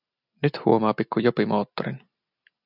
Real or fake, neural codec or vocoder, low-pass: real; none; 5.4 kHz